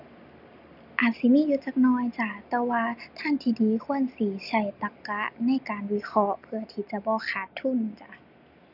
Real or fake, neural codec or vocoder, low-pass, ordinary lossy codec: real; none; 5.4 kHz; none